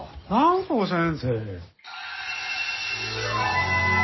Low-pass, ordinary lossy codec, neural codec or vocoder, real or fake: 7.2 kHz; MP3, 24 kbps; none; real